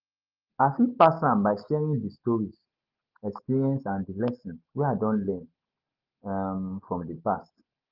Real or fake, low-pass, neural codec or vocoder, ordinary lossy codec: real; 5.4 kHz; none; Opus, 32 kbps